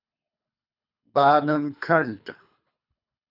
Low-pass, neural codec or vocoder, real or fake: 5.4 kHz; codec, 24 kHz, 3 kbps, HILCodec; fake